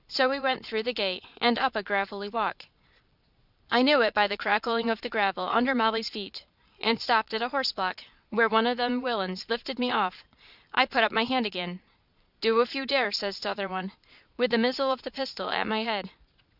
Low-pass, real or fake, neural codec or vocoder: 5.4 kHz; fake; vocoder, 22.05 kHz, 80 mel bands, Vocos